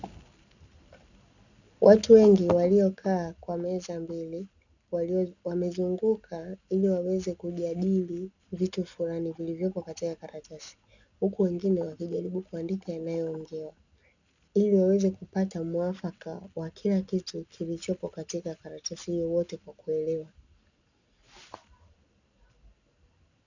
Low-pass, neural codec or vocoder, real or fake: 7.2 kHz; none; real